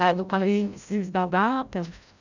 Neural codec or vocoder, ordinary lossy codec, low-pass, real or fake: codec, 16 kHz, 0.5 kbps, FreqCodec, larger model; none; 7.2 kHz; fake